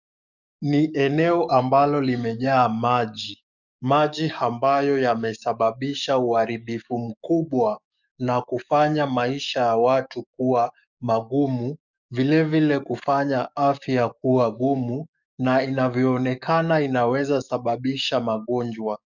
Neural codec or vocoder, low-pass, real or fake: codec, 44.1 kHz, 7.8 kbps, DAC; 7.2 kHz; fake